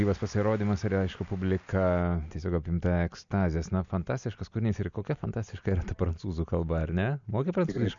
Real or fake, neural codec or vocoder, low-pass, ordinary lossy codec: real; none; 7.2 kHz; MP3, 64 kbps